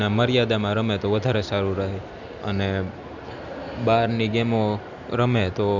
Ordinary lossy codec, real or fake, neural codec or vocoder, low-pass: none; real; none; 7.2 kHz